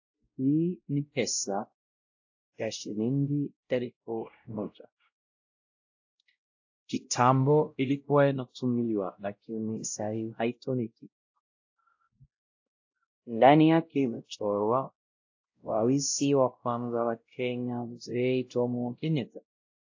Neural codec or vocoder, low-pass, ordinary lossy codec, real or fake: codec, 16 kHz, 0.5 kbps, X-Codec, WavLM features, trained on Multilingual LibriSpeech; 7.2 kHz; AAC, 48 kbps; fake